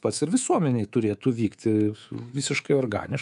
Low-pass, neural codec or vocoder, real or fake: 10.8 kHz; codec, 24 kHz, 3.1 kbps, DualCodec; fake